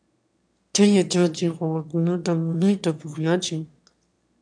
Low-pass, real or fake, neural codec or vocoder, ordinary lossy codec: 9.9 kHz; fake; autoencoder, 22.05 kHz, a latent of 192 numbers a frame, VITS, trained on one speaker; MP3, 96 kbps